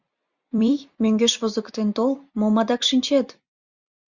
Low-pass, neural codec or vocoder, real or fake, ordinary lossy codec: 7.2 kHz; vocoder, 44.1 kHz, 128 mel bands every 256 samples, BigVGAN v2; fake; Opus, 64 kbps